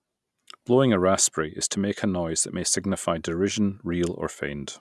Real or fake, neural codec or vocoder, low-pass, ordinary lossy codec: real; none; none; none